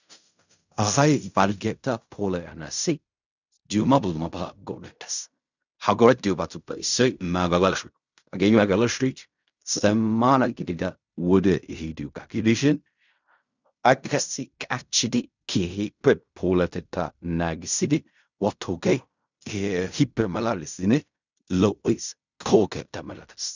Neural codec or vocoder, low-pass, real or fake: codec, 16 kHz in and 24 kHz out, 0.4 kbps, LongCat-Audio-Codec, fine tuned four codebook decoder; 7.2 kHz; fake